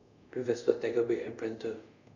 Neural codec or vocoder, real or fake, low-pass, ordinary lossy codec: codec, 24 kHz, 0.5 kbps, DualCodec; fake; 7.2 kHz; none